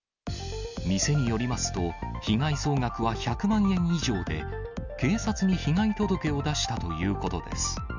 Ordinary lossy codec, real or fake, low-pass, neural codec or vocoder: none; real; 7.2 kHz; none